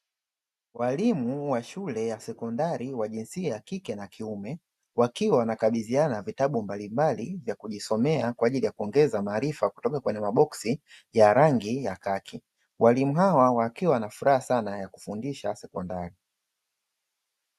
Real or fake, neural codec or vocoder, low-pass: real; none; 14.4 kHz